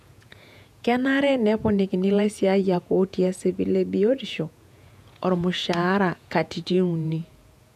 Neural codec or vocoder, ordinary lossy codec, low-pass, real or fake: vocoder, 48 kHz, 128 mel bands, Vocos; none; 14.4 kHz; fake